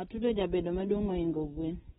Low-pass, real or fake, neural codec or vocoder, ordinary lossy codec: 7.2 kHz; real; none; AAC, 16 kbps